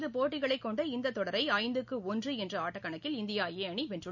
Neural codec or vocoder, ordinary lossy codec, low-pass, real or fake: none; none; 7.2 kHz; real